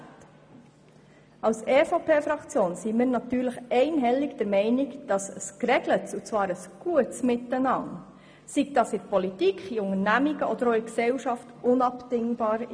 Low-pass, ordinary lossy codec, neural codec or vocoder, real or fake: none; none; none; real